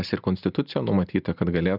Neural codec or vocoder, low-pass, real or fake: none; 5.4 kHz; real